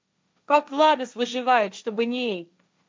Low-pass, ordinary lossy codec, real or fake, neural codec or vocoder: none; none; fake; codec, 16 kHz, 1.1 kbps, Voila-Tokenizer